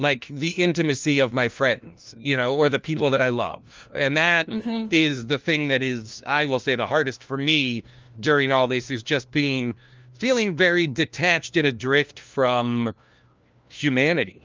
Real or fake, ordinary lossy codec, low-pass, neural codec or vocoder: fake; Opus, 24 kbps; 7.2 kHz; codec, 16 kHz, 1 kbps, FunCodec, trained on LibriTTS, 50 frames a second